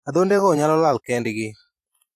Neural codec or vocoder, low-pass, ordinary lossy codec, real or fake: vocoder, 44.1 kHz, 128 mel bands every 512 samples, BigVGAN v2; 14.4 kHz; AAC, 64 kbps; fake